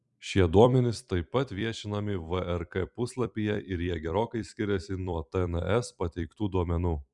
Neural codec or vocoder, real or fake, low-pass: vocoder, 44.1 kHz, 128 mel bands every 256 samples, BigVGAN v2; fake; 10.8 kHz